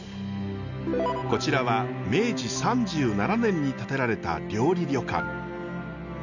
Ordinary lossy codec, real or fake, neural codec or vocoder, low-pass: none; real; none; 7.2 kHz